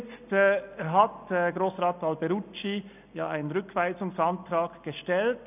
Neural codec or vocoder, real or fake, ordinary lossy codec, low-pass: none; real; none; 3.6 kHz